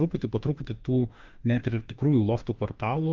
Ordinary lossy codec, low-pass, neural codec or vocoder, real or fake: Opus, 24 kbps; 7.2 kHz; autoencoder, 48 kHz, 32 numbers a frame, DAC-VAE, trained on Japanese speech; fake